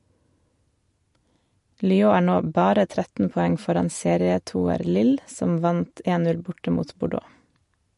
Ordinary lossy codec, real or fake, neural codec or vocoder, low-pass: MP3, 48 kbps; real; none; 14.4 kHz